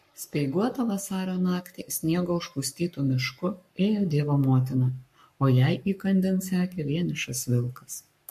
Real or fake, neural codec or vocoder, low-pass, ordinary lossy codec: fake; codec, 44.1 kHz, 7.8 kbps, Pupu-Codec; 14.4 kHz; MP3, 64 kbps